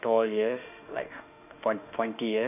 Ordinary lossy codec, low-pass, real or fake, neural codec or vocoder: none; 3.6 kHz; fake; autoencoder, 48 kHz, 32 numbers a frame, DAC-VAE, trained on Japanese speech